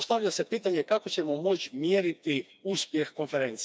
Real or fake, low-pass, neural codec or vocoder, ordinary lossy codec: fake; none; codec, 16 kHz, 2 kbps, FreqCodec, smaller model; none